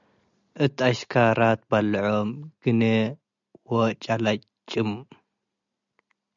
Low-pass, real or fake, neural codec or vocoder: 7.2 kHz; real; none